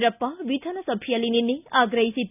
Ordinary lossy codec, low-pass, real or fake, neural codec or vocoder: none; 3.6 kHz; real; none